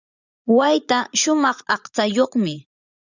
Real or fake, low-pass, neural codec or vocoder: fake; 7.2 kHz; vocoder, 44.1 kHz, 80 mel bands, Vocos